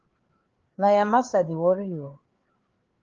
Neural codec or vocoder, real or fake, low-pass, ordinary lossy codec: codec, 16 kHz, 4 kbps, FreqCodec, larger model; fake; 7.2 kHz; Opus, 16 kbps